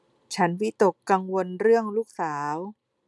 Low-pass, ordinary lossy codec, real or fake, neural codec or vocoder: none; none; real; none